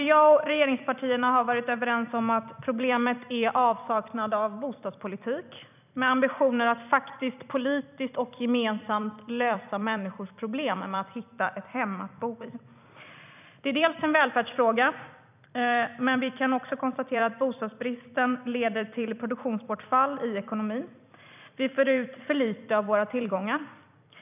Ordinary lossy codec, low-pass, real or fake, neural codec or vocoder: none; 3.6 kHz; fake; vocoder, 44.1 kHz, 128 mel bands every 256 samples, BigVGAN v2